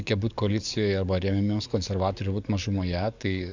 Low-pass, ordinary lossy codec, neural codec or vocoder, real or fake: 7.2 kHz; Opus, 64 kbps; codec, 44.1 kHz, 7.8 kbps, DAC; fake